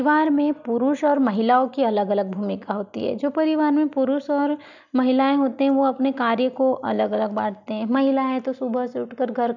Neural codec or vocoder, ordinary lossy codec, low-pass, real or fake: none; none; 7.2 kHz; real